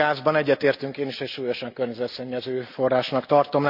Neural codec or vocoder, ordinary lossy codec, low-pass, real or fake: none; none; 5.4 kHz; real